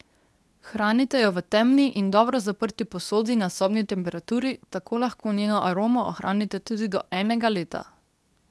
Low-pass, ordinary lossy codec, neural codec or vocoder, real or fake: none; none; codec, 24 kHz, 0.9 kbps, WavTokenizer, medium speech release version 2; fake